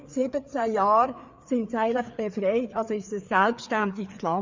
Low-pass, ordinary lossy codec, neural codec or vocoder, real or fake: 7.2 kHz; MP3, 64 kbps; codec, 16 kHz, 4 kbps, FreqCodec, larger model; fake